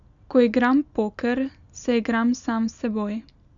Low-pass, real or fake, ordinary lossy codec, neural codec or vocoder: 7.2 kHz; real; none; none